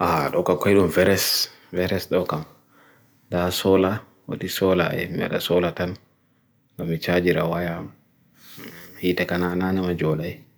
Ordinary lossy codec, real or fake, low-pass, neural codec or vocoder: none; real; none; none